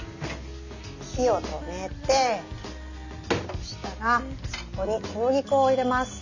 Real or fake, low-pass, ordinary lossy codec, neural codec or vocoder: real; 7.2 kHz; none; none